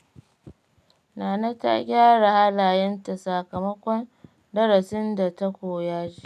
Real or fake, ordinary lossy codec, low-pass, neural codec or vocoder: real; none; 14.4 kHz; none